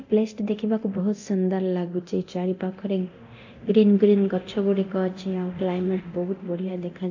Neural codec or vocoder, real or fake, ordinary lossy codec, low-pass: codec, 24 kHz, 0.9 kbps, DualCodec; fake; AAC, 48 kbps; 7.2 kHz